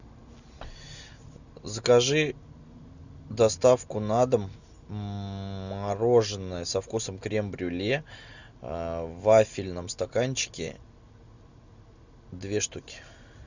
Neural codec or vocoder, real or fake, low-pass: none; real; 7.2 kHz